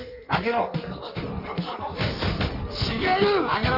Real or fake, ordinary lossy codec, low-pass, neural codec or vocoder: fake; none; 5.4 kHz; codec, 16 kHz in and 24 kHz out, 1.1 kbps, FireRedTTS-2 codec